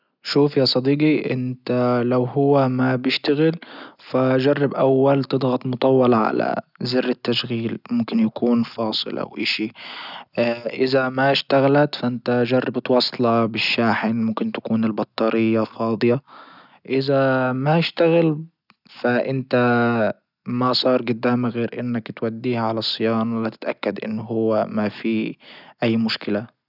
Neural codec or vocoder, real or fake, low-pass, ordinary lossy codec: none; real; 5.4 kHz; none